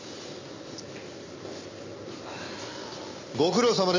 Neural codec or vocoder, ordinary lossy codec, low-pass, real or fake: none; MP3, 64 kbps; 7.2 kHz; real